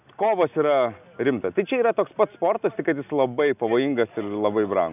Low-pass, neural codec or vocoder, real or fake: 3.6 kHz; none; real